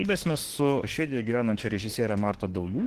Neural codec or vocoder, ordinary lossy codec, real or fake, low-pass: autoencoder, 48 kHz, 32 numbers a frame, DAC-VAE, trained on Japanese speech; Opus, 16 kbps; fake; 14.4 kHz